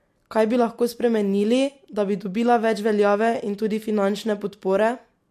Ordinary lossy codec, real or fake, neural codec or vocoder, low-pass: MP3, 64 kbps; real; none; 14.4 kHz